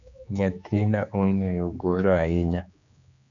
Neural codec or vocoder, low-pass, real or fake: codec, 16 kHz, 2 kbps, X-Codec, HuBERT features, trained on general audio; 7.2 kHz; fake